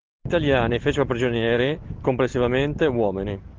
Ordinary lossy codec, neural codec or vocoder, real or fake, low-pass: Opus, 16 kbps; none; real; 7.2 kHz